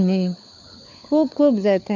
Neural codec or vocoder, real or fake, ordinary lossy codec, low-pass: codec, 16 kHz, 4 kbps, FunCodec, trained on LibriTTS, 50 frames a second; fake; none; 7.2 kHz